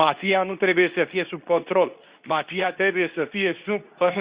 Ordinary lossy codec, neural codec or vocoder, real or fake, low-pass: Opus, 64 kbps; codec, 24 kHz, 0.9 kbps, WavTokenizer, medium speech release version 2; fake; 3.6 kHz